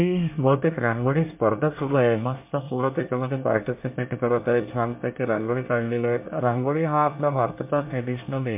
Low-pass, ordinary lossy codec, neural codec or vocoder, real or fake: 3.6 kHz; MP3, 32 kbps; codec, 24 kHz, 1 kbps, SNAC; fake